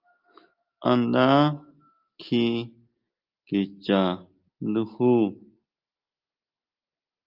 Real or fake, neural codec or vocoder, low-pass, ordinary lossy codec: real; none; 5.4 kHz; Opus, 24 kbps